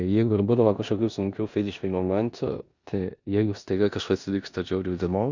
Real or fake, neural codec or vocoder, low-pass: fake; codec, 16 kHz in and 24 kHz out, 0.9 kbps, LongCat-Audio-Codec, four codebook decoder; 7.2 kHz